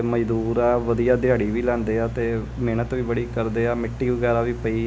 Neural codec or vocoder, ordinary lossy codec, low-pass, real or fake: none; none; none; real